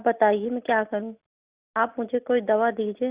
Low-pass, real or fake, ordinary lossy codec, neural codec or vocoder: 3.6 kHz; real; Opus, 64 kbps; none